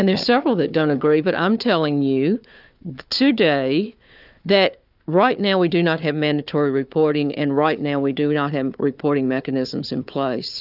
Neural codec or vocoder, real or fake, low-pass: codec, 16 kHz, 4 kbps, FunCodec, trained on Chinese and English, 50 frames a second; fake; 5.4 kHz